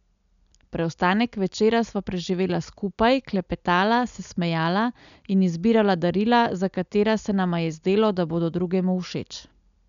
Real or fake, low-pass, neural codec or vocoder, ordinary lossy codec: real; 7.2 kHz; none; none